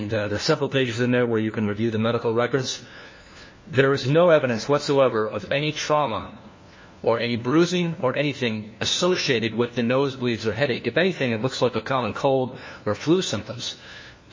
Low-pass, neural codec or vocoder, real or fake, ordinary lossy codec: 7.2 kHz; codec, 16 kHz, 1 kbps, FunCodec, trained on LibriTTS, 50 frames a second; fake; MP3, 32 kbps